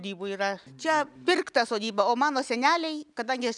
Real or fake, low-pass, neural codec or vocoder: real; 10.8 kHz; none